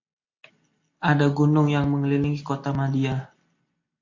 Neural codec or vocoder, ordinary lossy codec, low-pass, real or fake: none; AAC, 48 kbps; 7.2 kHz; real